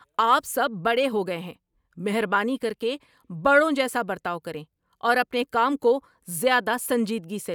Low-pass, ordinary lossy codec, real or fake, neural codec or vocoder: none; none; real; none